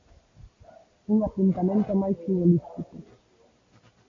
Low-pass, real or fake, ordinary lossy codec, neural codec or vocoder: 7.2 kHz; real; MP3, 48 kbps; none